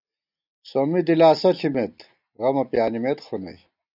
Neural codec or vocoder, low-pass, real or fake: none; 5.4 kHz; real